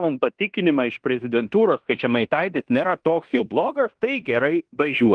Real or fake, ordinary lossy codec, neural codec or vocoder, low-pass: fake; Opus, 24 kbps; codec, 16 kHz in and 24 kHz out, 0.9 kbps, LongCat-Audio-Codec, fine tuned four codebook decoder; 9.9 kHz